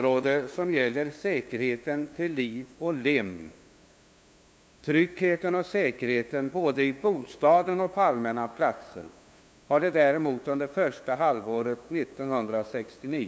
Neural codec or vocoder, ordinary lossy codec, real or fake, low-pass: codec, 16 kHz, 2 kbps, FunCodec, trained on LibriTTS, 25 frames a second; none; fake; none